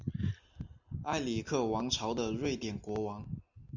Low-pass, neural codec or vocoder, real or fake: 7.2 kHz; none; real